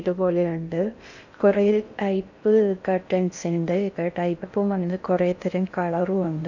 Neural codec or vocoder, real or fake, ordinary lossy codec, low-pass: codec, 16 kHz in and 24 kHz out, 0.6 kbps, FocalCodec, streaming, 2048 codes; fake; none; 7.2 kHz